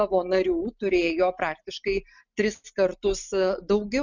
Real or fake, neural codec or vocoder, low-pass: real; none; 7.2 kHz